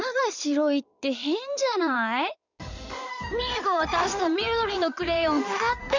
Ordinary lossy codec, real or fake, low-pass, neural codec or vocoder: none; fake; 7.2 kHz; codec, 16 kHz in and 24 kHz out, 2.2 kbps, FireRedTTS-2 codec